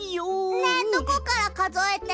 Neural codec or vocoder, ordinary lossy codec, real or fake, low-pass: none; none; real; none